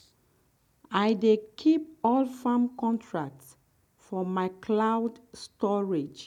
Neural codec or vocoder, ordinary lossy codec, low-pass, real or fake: none; none; 19.8 kHz; real